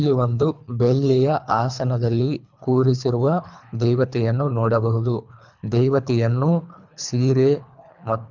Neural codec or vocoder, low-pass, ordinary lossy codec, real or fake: codec, 24 kHz, 3 kbps, HILCodec; 7.2 kHz; none; fake